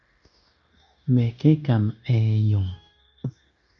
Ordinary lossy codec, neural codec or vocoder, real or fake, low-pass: MP3, 96 kbps; codec, 16 kHz, 0.9 kbps, LongCat-Audio-Codec; fake; 7.2 kHz